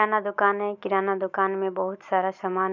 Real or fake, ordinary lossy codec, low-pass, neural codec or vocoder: real; none; 7.2 kHz; none